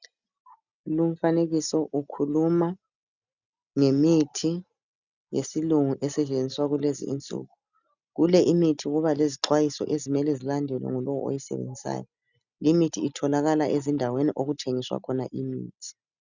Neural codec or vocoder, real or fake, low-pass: none; real; 7.2 kHz